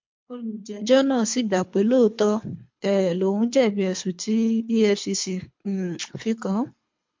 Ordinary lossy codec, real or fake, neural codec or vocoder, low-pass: MP3, 48 kbps; fake; codec, 24 kHz, 3 kbps, HILCodec; 7.2 kHz